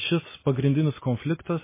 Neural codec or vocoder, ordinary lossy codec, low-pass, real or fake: none; MP3, 16 kbps; 3.6 kHz; real